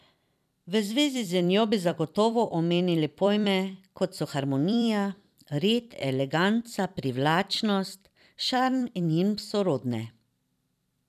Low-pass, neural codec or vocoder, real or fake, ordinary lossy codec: 14.4 kHz; vocoder, 44.1 kHz, 128 mel bands every 256 samples, BigVGAN v2; fake; none